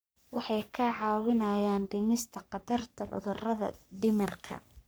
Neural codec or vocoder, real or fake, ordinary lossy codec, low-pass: codec, 44.1 kHz, 3.4 kbps, Pupu-Codec; fake; none; none